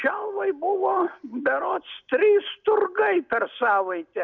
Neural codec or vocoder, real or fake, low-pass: none; real; 7.2 kHz